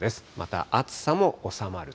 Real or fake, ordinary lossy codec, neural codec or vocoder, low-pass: real; none; none; none